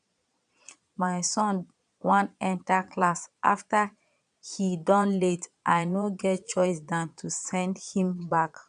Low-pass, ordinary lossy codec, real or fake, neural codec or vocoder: 9.9 kHz; none; fake; vocoder, 22.05 kHz, 80 mel bands, Vocos